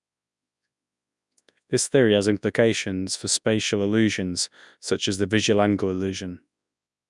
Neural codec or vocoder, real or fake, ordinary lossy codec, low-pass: codec, 24 kHz, 0.9 kbps, WavTokenizer, large speech release; fake; none; 10.8 kHz